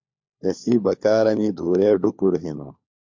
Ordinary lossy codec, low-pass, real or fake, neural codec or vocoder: MP3, 48 kbps; 7.2 kHz; fake; codec, 16 kHz, 4 kbps, FunCodec, trained on LibriTTS, 50 frames a second